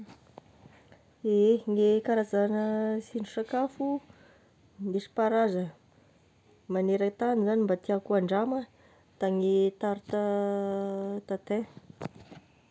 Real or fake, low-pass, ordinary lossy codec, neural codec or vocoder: real; none; none; none